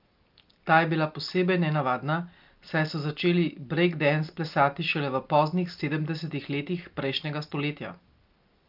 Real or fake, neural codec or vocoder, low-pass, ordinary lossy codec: real; none; 5.4 kHz; Opus, 24 kbps